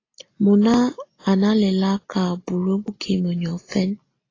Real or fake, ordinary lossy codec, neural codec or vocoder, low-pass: real; AAC, 32 kbps; none; 7.2 kHz